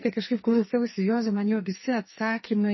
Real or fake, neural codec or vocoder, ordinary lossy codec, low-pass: fake; codec, 44.1 kHz, 2.6 kbps, SNAC; MP3, 24 kbps; 7.2 kHz